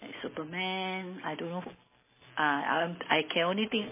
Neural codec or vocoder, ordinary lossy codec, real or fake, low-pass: none; MP3, 16 kbps; real; 3.6 kHz